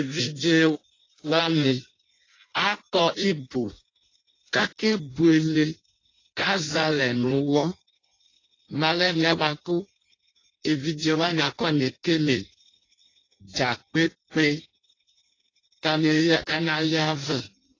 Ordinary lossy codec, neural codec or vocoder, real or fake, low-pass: AAC, 32 kbps; codec, 16 kHz in and 24 kHz out, 0.6 kbps, FireRedTTS-2 codec; fake; 7.2 kHz